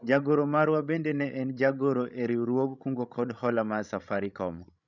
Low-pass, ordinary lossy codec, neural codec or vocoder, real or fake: 7.2 kHz; none; codec, 16 kHz, 16 kbps, FreqCodec, larger model; fake